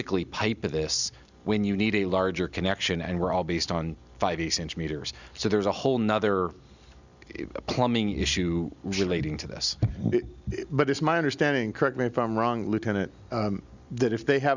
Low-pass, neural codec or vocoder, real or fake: 7.2 kHz; none; real